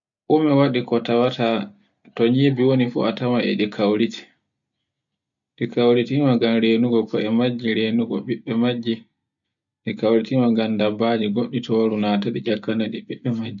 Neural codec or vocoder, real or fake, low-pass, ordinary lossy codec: none; real; 7.2 kHz; none